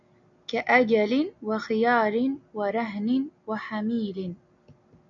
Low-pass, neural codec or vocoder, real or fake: 7.2 kHz; none; real